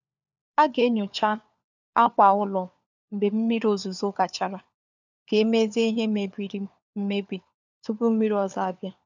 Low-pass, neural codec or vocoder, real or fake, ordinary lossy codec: 7.2 kHz; codec, 16 kHz, 4 kbps, FunCodec, trained on LibriTTS, 50 frames a second; fake; none